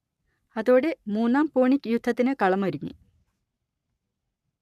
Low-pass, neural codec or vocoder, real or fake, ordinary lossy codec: 14.4 kHz; codec, 44.1 kHz, 7.8 kbps, Pupu-Codec; fake; none